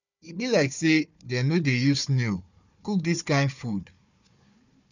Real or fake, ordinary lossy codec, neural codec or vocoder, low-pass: fake; none; codec, 16 kHz, 4 kbps, FunCodec, trained on Chinese and English, 50 frames a second; 7.2 kHz